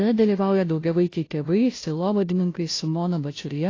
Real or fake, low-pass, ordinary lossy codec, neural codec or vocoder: fake; 7.2 kHz; AAC, 32 kbps; codec, 16 kHz, 0.5 kbps, FunCodec, trained on Chinese and English, 25 frames a second